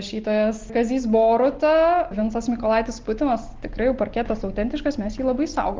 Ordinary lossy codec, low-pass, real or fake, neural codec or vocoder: Opus, 16 kbps; 7.2 kHz; real; none